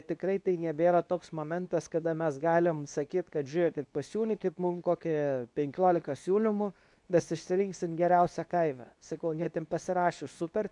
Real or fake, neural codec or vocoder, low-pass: fake; codec, 24 kHz, 0.9 kbps, WavTokenizer, medium speech release version 2; 10.8 kHz